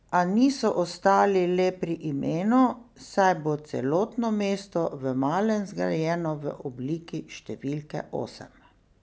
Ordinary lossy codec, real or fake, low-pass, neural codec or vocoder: none; real; none; none